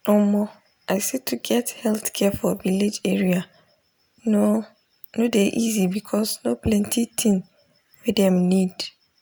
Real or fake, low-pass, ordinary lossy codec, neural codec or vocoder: real; none; none; none